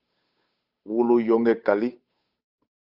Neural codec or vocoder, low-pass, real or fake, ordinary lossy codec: codec, 16 kHz, 8 kbps, FunCodec, trained on Chinese and English, 25 frames a second; 5.4 kHz; fake; Opus, 64 kbps